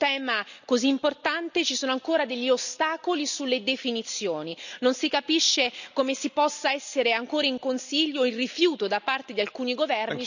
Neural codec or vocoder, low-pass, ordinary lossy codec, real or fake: none; 7.2 kHz; none; real